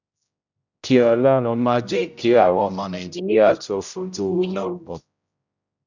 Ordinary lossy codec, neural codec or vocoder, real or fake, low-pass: none; codec, 16 kHz, 0.5 kbps, X-Codec, HuBERT features, trained on general audio; fake; 7.2 kHz